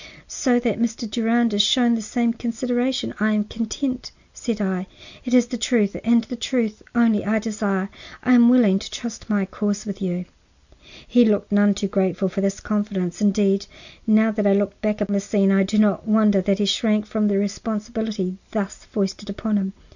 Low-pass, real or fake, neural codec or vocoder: 7.2 kHz; real; none